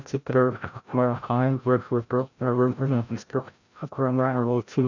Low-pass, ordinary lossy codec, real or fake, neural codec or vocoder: 7.2 kHz; none; fake; codec, 16 kHz, 0.5 kbps, FreqCodec, larger model